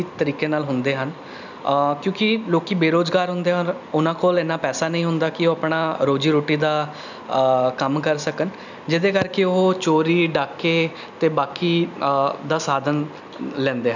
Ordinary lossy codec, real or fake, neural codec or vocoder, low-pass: none; real; none; 7.2 kHz